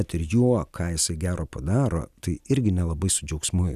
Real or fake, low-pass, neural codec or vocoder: real; 14.4 kHz; none